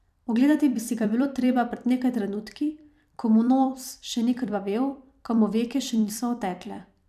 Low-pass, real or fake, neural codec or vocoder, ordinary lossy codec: 14.4 kHz; fake; vocoder, 44.1 kHz, 128 mel bands every 256 samples, BigVGAN v2; none